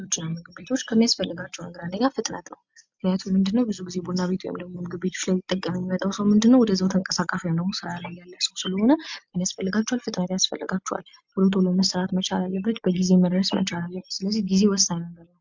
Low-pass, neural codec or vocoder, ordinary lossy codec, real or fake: 7.2 kHz; none; MP3, 64 kbps; real